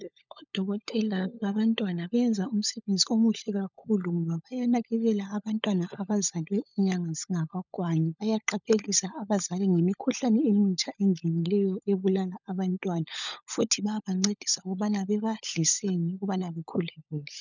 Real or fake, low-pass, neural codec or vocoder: fake; 7.2 kHz; codec, 16 kHz, 16 kbps, FunCodec, trained on LibriTTS, 50 frames a second